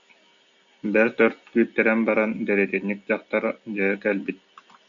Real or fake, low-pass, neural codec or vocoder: real; 7.2 kHz; none